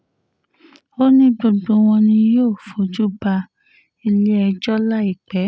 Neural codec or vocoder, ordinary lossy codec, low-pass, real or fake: none; none; none; real